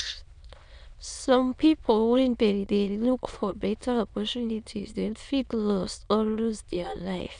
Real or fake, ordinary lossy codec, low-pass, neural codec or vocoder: fake; none; 9.9 kHz; autoencoder, 22.05 kHz, a latent of 192 numbers a frame, VITS, trained on many speakers